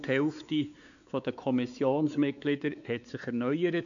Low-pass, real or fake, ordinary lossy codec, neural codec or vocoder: 7.2 kHz; fake; none; codec, 16 kHz, 4 kbps, X-Codec, WavLM features, trained on Multilingual LibriSpeech